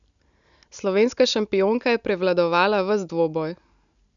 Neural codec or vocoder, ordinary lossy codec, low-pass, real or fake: none; none; 7.2 kHz; real